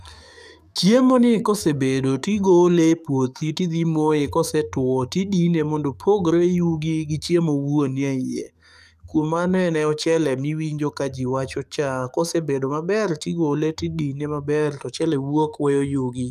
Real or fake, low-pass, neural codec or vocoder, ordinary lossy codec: fake; 14.4 kHz; codec, 44.1 kHz, 7.8 kbps, DAC; none